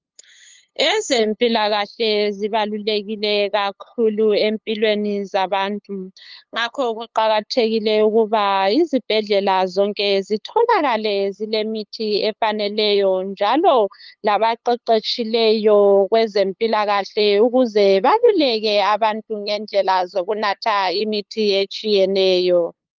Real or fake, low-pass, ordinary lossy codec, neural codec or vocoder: fake; 7.2 kHz; Opus, 32 kbps; codec, 16 kHz, 8 kbps, FunCodec, trained on LibriTTS, 25 frames a second